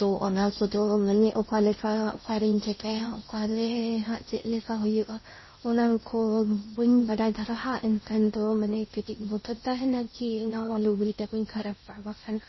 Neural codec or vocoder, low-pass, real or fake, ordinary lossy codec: codec, 16 kHz in and 24 kHz out, 0.6 kbps, FocalCodec, streaming, 4096 codes; 7.2 kHz; fake; MP3, 24 kbps